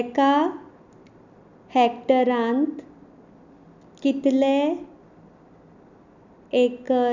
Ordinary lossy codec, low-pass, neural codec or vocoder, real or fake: none; 7.2 kHz; none; real